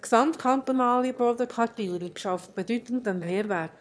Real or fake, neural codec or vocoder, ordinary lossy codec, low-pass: fake; autoencoder, 22.05 kHz, a latent of 192 numbers a frame, VITS, trained on one speaker; none; none